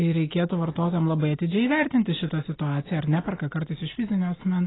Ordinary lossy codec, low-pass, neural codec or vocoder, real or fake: AAC, 16 kbps; 7.2 kHz; none; real